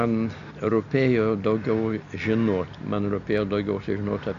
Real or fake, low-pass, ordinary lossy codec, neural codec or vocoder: real; 7.2 kHz; AAC, 64 kbps; none